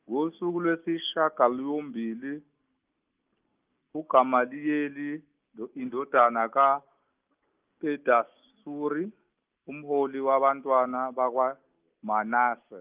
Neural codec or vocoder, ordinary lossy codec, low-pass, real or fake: none; Opus, 24 kbps; 3.6 kHz; real